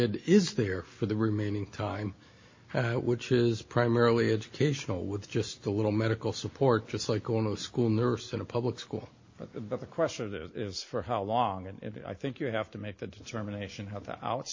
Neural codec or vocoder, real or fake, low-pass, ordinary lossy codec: none; real; 7.2 kHz; MP3, 32 kbps